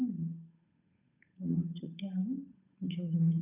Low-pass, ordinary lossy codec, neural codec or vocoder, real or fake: 3.6 kHz; none; codec, 16 kHz, 8 kbps, FunCodec, trained on Chinese and English, 25 frames a second; fake